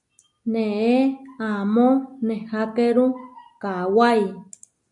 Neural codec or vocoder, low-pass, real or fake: none; 10.8 kHz; real